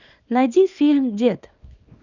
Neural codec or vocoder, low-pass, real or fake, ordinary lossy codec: codec, 24 kHz, 0.9 kbps, WavTokenizer, small release; 7.2 kHz; fake; none